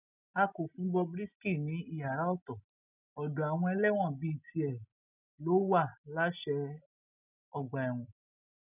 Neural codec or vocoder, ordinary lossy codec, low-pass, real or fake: none; none; 3.6 kHz; real